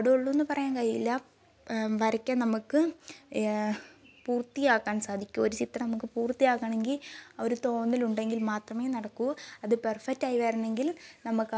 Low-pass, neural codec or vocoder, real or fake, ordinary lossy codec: none; none; real; none